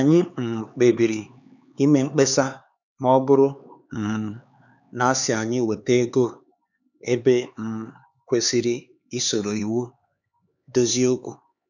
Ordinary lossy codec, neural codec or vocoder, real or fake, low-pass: none; codec, 16 kHz, 4 kbps, X-Codec, HuBERT features, trained on LibriSpeech; fake; 7.2 kHz